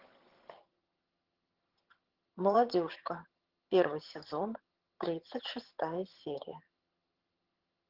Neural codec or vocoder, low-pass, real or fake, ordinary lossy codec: vocoder, 22.05 kHz, 80 mel bands, HiFi-GAN; 5.4 kHz; fake; Opus, 16 kbps